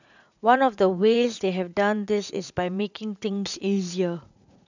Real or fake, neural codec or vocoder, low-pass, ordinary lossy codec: fake; vocoder, 44.1 kHz, 80 mel bands, Vocos; 7.2 kHz; none